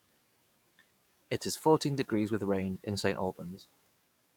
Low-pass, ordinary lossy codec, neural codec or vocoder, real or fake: 19.8 kHz; none; codec, 44.1 kHz, 7.8 kbps, DAC; fake